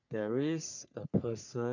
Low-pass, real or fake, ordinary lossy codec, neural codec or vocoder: 7.2 kHz; fake; none; codec, 44.1 kHz, 3.4 kbps, Pupu-Codec